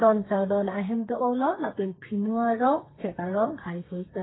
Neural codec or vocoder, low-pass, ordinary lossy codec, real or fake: codec, 32 kHz, 1.9 kbps, SNAC; 7.2 kHz; AAC, 16 kbps; fake